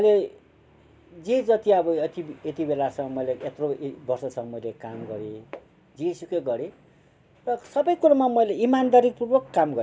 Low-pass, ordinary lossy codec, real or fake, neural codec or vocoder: none; none; real; none